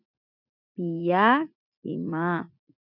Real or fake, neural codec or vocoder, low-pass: fake; vocoder, 44.1 kHz, 80 mel bands, Vocos; 5.4 kHz